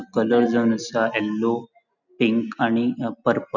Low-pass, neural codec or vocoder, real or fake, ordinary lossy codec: 7.2 kHz; none; real; none